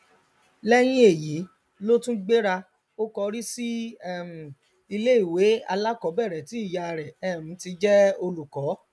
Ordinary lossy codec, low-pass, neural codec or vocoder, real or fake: none; none; none; real